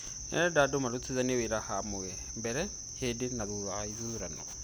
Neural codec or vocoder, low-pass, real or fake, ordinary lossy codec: none; none; real; none